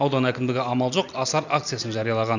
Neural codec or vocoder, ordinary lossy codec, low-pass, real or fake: none; none; 7.2 kHz; real